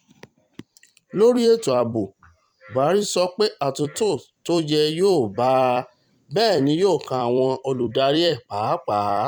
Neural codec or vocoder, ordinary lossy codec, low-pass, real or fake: vocoder, 44.1 kHz, 128 mel bands every 256 samples, BigVGAN v2; none; 19.8 kHz; fake